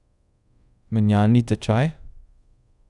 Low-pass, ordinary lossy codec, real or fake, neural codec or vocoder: 10.8 kHz; none; fake; codec, 24 kHz, 0.5 kbps, DualCodec